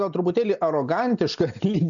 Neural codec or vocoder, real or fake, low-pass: none; real; 7.2 kHz